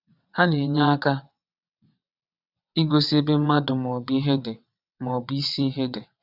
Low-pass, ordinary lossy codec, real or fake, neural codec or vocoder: 5.4 kHz; none; fake; vocoder, 22.05 kHz, 80 mel bands, WaveNeXt